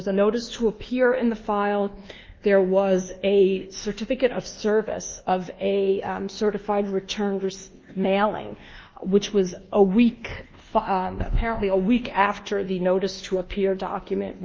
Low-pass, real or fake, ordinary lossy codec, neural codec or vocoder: 7.2 kHz; fake; Opus, 32 kbps; codec, 24 kHz, 1.2 kbps, DualCodec